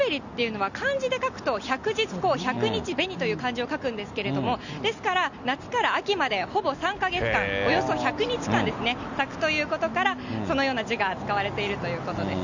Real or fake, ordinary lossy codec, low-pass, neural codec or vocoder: real; none; 7.2 kHz; none